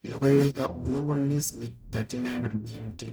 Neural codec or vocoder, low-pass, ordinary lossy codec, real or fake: codec, 44.1 kHz, 0.9 kbps, DAC; none; none; fake